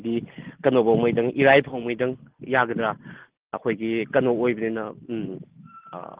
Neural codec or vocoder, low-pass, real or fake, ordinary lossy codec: none; 3.6 kHz; real; Opus, 16 kbps